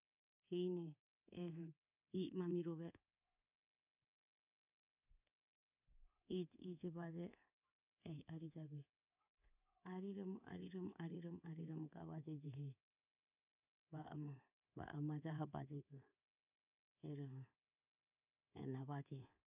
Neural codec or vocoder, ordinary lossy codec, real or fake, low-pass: codec, 24 kHz, 3.1 kbps, DualCodec; none; fake; 3.6 kHz